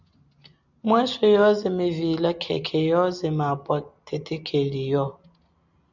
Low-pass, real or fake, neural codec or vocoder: 7.2 kHz; real; none